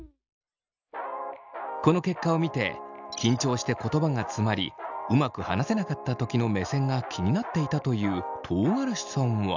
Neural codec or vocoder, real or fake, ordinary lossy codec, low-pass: none; real; none; 7.2 kHz